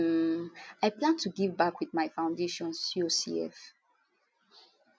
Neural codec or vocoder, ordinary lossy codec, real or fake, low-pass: none; none; real; none